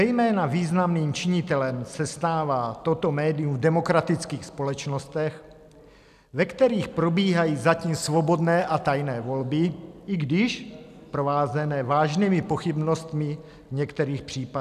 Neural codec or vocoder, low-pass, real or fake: none; 14.4 kHz; real